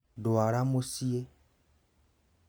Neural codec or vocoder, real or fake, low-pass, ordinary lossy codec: none; real; none; none